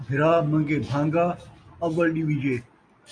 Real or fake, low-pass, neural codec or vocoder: real; 9.9 kHz; none